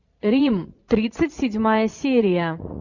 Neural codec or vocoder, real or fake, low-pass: vocoder, 44.1 kHz, 80 mel bands, Vocos; fake; 7.2 kHz